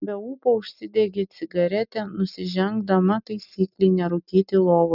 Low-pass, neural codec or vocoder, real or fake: 5.4 kHz; none; real